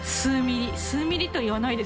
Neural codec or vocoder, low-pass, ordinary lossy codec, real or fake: none; none; none; real